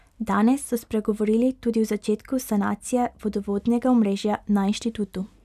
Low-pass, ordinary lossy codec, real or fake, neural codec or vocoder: 14.4 kHz; none; real; none